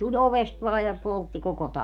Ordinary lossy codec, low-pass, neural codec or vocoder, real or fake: none; 19.8 kHz; codec, 44.1 kHz, 7.8 kbps, DAC; fake